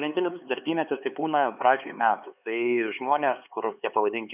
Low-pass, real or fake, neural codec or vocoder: 3.6 kHz; fake; codec, 16 kHz, 4 kbps, X-Codec, HuBERT features, trained on LibriSpeech